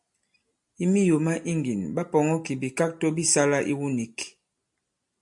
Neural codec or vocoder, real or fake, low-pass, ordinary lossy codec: none; real; 10.8 kHz; MP3, 64 kbps